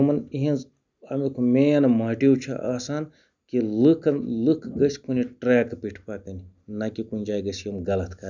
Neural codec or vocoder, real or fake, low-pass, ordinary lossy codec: none; real; 7.2 kHz; none